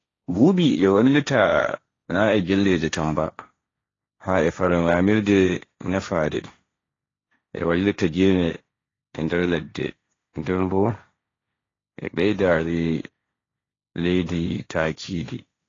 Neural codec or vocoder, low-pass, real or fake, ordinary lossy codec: codec, 16 kHz, 1.1 kbps, Voila-Tokenizer; 7.2 kHz; fake; AAC, 32 kbps